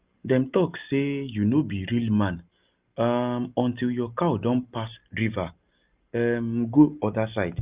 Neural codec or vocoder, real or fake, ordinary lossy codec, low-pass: none; real; Opus, 32 kbps; 3.6 kHz